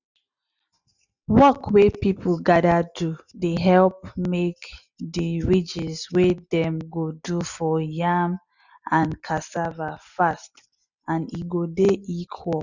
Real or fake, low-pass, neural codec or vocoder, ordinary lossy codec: real; 7.2 kHz; none; none